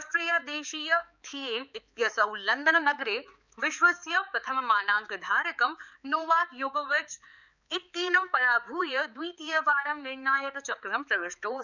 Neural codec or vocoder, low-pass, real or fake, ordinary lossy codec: codec, 16 kHz, 4 kbps, X-Codec, HuBERT features, trained on balanced general audio; 7.2 kHz; fake; none